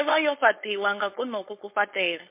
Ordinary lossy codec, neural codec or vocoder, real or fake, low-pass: MP3, 24 kbps; codec, 16 kHz, 4.8 kbps, FACodec; fake; 3.6 kHz